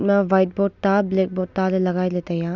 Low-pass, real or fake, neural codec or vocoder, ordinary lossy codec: 7.2 kHz; real; none; none